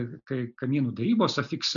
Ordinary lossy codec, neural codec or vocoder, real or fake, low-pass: MP3, 96 kbps; none; real; 7.2 kHz